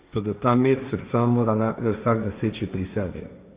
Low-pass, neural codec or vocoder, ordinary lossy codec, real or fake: 3.6 kHz; codec, 16 kHz, 1.1 kbps, Voila-Tokenizer; Opus, 64 kbps; fake